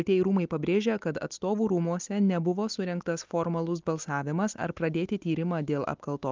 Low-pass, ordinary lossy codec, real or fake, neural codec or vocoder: 7.2 kHz; Opus, 32 kbps; real; none